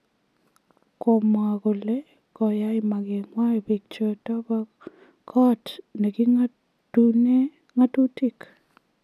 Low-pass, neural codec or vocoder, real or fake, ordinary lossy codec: 14.4 kHz; none; real; none